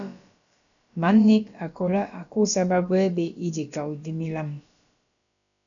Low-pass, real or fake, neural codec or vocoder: 7.2 kHz; fake; codec, 16 kHz, about 1 kbps, DyCAST, with the encoder's durations